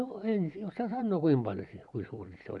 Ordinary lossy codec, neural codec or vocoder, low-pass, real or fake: none; vocoder, 44.1 kHz, 128 mel bands every 512 samples, BigVGAN v2; 10.8 kHz; fake